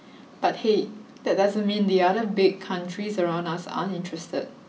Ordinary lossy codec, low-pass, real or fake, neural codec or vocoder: none; none; real; none